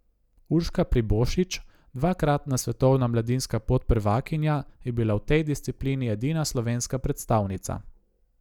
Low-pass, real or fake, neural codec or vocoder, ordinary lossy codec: 19.8 kHz; real; none; none